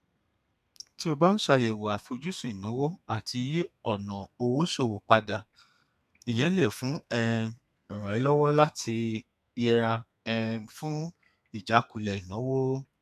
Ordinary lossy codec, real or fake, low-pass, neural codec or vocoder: none; fake; 14.4 kHz; codec, 32 kHz, 1.9 kbps, SNAC